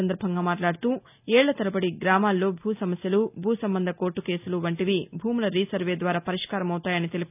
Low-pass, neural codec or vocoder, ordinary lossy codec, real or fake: 3.6 kHz; none; none; real